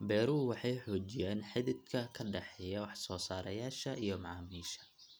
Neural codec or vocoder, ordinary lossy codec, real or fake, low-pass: none; none; real; none